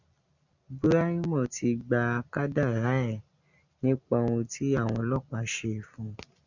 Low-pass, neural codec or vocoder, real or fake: 7.2 kHz; none; real